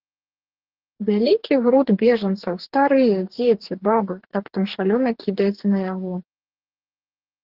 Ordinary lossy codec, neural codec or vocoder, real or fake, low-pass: Opus, 16 kbps; codec, 44.1 kHz, 2.6 kbps, DAC; fake; 5.4 kHz